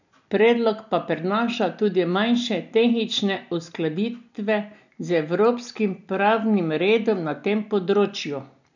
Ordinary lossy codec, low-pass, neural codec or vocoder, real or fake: none; 7.2 kHz; none; real